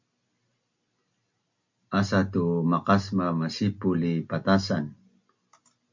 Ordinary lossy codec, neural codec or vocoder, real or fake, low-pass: MP3, 64 kbps; none; real; 7.2 kHz